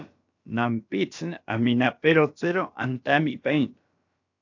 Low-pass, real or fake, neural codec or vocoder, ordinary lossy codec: 7.2 kHz; fake; codec, 16 kHz, about 1 kbps, DyCAST, with the encoder's durations; AAC, 48 kbps